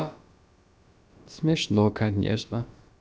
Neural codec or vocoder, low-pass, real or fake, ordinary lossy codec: codec, 16 kHz, about 1 kbps, DyCAST, with the encoder's durations; none; fake; none